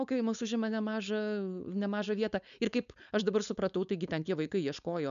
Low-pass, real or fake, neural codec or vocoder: 7.2 kHz; fake; codec, 16 kHz, 4.8 kbps, FACodec